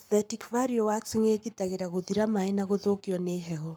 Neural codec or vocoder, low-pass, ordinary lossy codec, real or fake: codec, 44.1 kHz, 7.8 kbps, Pupu-Codec; none; none; fake